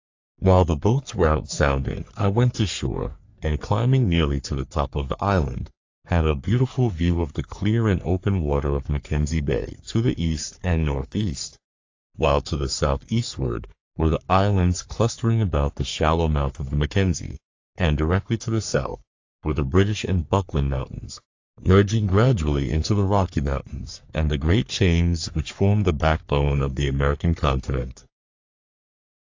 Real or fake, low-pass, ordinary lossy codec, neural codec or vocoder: fake; 7.2 kHz; AAC, 48 kbps; codec, 44.1 kHz, 3.4 kbps, Pupu-Codec